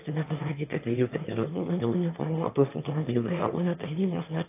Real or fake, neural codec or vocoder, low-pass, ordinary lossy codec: fake; autoencoder, 22.05 kHz, a latent of 192 numbers a frame, VITS, trained on one speaker; 3.6 kHz; AAC, 24 kbps